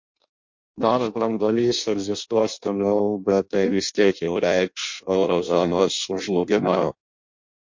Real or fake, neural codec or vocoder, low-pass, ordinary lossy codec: fake; codec, 16 kHz in and 24 kHz out, 0.6 kbps, FireRedTTS-2 codec; 7.2 kHz; MP3, 48 kbps